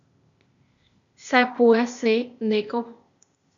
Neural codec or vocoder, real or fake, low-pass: codec, 16 kHz, 0.8 kbps, ZipCodec; fake; 7.2 kHz